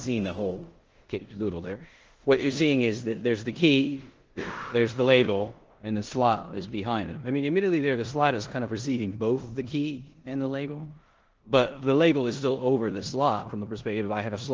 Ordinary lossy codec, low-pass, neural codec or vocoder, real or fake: Opus, 16 kbps; 7.2 kHz; codec, 16 kHz in and 24 kHz out, 0.9 kbps, LongCat-Audio-Codec, four codebook decoder; fake